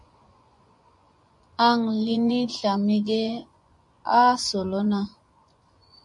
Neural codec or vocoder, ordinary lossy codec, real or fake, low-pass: vocoder, 24 kHz, 100 mel bands, Vocos; MP3, 64 kbps; fake; 10.8 kHz